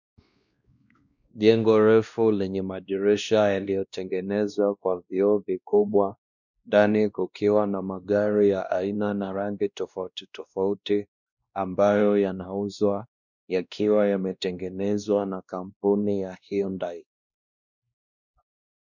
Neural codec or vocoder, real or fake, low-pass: codec, 16 kHz, 1 kbps, X-Codec, WavLM features, trained on Multilingual LibriSpeech; fake; 7.2 kHz